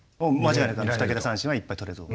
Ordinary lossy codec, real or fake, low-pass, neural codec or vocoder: none; real; none; none